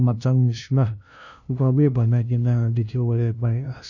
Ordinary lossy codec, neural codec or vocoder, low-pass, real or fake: none; codec, 16 kHz, 0.5 kbps, FunCodec, trained on LibriTTS, 25 frames a second; 7.2 kHz; fake